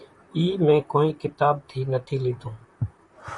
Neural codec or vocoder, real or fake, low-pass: vocoder, 44.1 kHz, 128 mel bands, Pupu-Vocoder; fake; 10.8 kHz